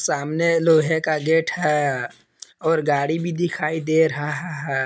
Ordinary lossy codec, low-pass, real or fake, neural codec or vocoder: none; none; real; none